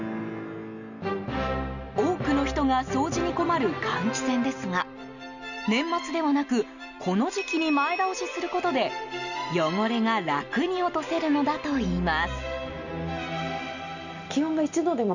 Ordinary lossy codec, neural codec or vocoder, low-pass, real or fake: none; none; 7.2 kHz; real